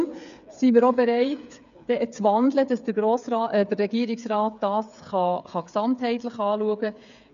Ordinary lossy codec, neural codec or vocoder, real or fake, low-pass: none; codec, 16 kHz, 8 kbps, FreqCodec, smaller model; fake; 7.2 kHz